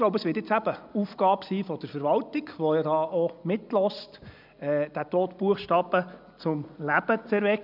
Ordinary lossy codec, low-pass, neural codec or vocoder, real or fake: none; 5.4 kHz; none; real